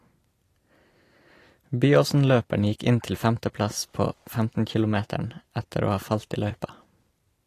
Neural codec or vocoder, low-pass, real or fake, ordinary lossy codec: vocoder, 44.1 kHz, 128 mel bands every 256 samples, BigVGAN v2; 14.4 kHz; fake; AAC, 48 kbps